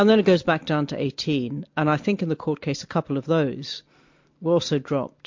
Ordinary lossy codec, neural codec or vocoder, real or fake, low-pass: MP3, 48 kbps; none; real; 7.2 kHz